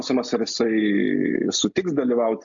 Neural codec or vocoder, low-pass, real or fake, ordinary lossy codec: none; 7.2 kHz; real; AAC, 64 kbps